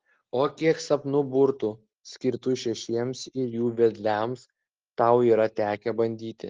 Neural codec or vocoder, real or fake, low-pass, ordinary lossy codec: none; real; 7.2 kHz; Opus, 16 kbps